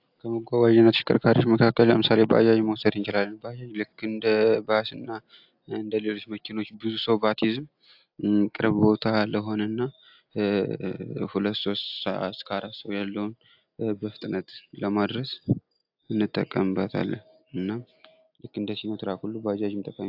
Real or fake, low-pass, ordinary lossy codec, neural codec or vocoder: real; 5.4 kHz; AAC, 48 kbps; none